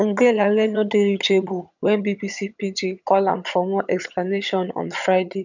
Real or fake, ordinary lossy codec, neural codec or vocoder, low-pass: fake; none; vocoder, 22.05 kHz, 80 mel bands, HiFi-GAN; 7.2 kHz